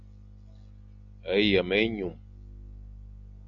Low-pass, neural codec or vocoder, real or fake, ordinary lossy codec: 7.2 kHz; none; real; MP3, 64 kbps